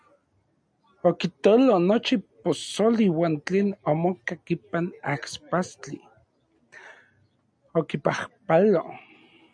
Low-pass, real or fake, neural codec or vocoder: 9.9 kHz; real; none